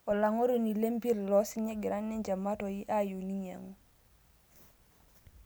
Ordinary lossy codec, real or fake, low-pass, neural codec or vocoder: none; real; none; none